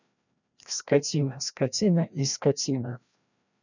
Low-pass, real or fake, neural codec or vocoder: 7.2 kHz; fake; codec, 16 kHz, 1 kbps, FreqCodec, larger model